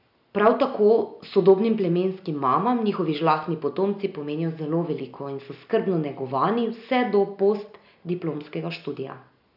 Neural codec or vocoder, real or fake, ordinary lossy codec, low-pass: none; real; none; 5.4 kHz